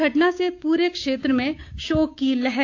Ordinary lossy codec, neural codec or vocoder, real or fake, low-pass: AAC, 48 kbps; codec, 16 kHz, 6 kbps, DAC; fake; 7.2 kHz